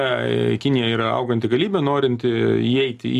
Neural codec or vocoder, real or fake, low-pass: none; real; 14.4 kHz